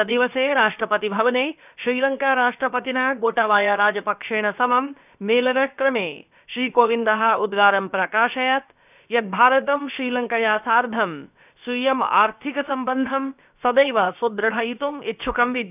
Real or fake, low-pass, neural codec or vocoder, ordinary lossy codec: fake; 3.6 kHz; codec, 16 kHz, about 1 kbps, DyCAST, with the encoder's durations; none